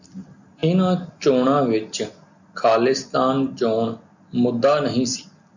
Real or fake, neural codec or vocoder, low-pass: real; none; 7.2 kHz